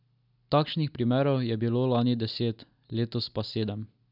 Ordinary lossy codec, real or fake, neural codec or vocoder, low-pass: none; real; none; 5.4 kHz